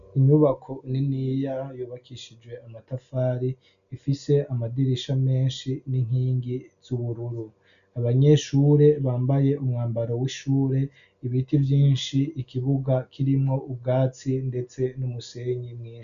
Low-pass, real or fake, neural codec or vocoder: 7.2 kHz; real; none